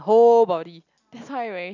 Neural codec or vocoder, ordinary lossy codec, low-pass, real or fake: none; none; 7.2 kHz; real